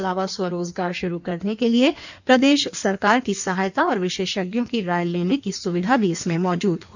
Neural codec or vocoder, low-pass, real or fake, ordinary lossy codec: codec, 16 kHz in and 24 kHz out, 1.1 kbps, FireRedTTS-2 codec; 7.2 kHz; fake; none